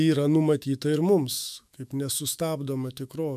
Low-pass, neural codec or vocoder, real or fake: 14.4 kHz; autoencoder, 48 kHz, 128 numbers a frame, DAC-VAE, trained on Japanese speech; fake